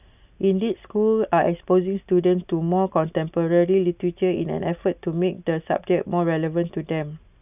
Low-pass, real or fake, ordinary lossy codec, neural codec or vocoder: 3.6 kHz; real; none; none